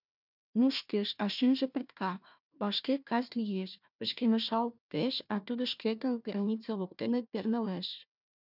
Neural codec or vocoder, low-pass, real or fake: codec, 16 kHz, 1 kbps, FunCodec, trained on Chinese and English, 50 frames a second; 5.4 kHz; fake